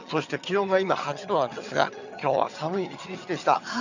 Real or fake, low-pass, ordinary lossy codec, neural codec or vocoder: fake; 7.2 kHz; none; vocoder, 22.05 kHz, 80 mel bands, HiFi-GAN